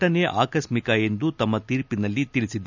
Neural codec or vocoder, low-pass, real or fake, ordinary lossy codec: none; 7.2 kHz; real; none